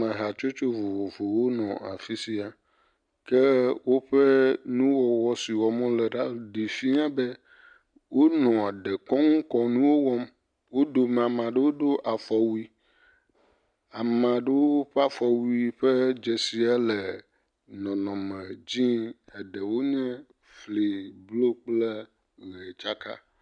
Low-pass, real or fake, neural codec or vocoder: 9.9 kHz; real; none